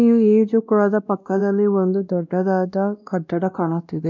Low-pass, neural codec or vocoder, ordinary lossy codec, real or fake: 7.2 kHz; codec, 16 kHz, 1 kbps, X-Codec, WavLM features, trained on Multilingual LibriSpeech; none; fake